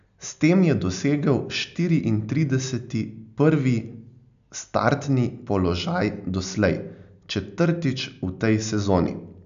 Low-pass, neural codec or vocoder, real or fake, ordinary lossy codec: 7.2 kHz; none; real; none